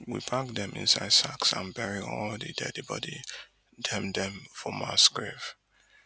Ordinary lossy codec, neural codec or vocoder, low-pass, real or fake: none; none; none; real